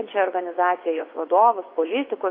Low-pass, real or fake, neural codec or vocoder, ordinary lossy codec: 5.4 kHz; fake; vocoder, 24 kHz, 100 mel bands, Vocos; AAC, 24 kbps